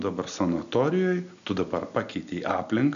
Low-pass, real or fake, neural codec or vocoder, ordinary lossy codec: 7.2 kHz; real; none; MP3, 96 kbps